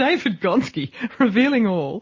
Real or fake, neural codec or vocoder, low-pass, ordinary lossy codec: real; none; 7.2 kHz; MP3, 32 kbps